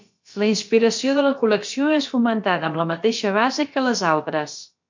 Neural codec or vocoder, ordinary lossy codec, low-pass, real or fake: codec, 16 kHz, about 1 kbps, DyCAST, with the encoder's durations; MP3, 48 kbps; 7.2 kHz; fake